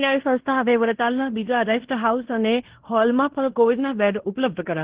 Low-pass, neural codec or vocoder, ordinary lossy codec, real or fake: 3.6 kHz; codec, 16 kHz in and 24 kHz out, 0.9 kbps, LongCat-Audio-Codec, fine tuned four codebook decoder; Opus, 16 kbps; fake